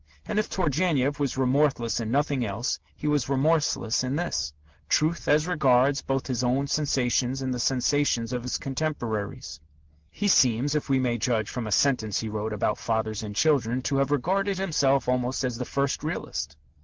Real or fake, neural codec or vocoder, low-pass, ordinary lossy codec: real; none; 7.2 kHz; Opus, 16 kbps